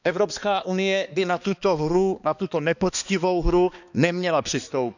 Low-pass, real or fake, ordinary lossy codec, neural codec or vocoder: 7.2 kHz; fake; none; codec, 16 kHz, 2 kbps, X-Codec, HuBERT features, trained on balanced general audio